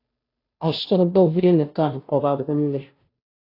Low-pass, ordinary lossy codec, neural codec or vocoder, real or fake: 5.4 kHz; AAC, 32 kbps; codec, 16 kHz, 0.5 kbps, FunCodec, trained on Chinese and English, 25 frames a second; fake